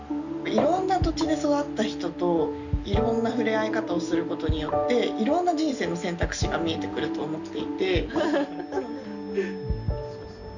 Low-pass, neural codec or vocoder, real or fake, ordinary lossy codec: 7.2 kHz; none; real; none